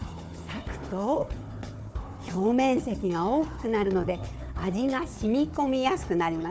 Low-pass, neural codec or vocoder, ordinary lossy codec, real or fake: none; codec, 16 kHz, 4 kbps, FunCodec, trained on Chinese and English, 50 frames a second; none; fake